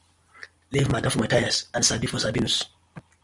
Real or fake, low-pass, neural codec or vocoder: real; 10.8 kHz; none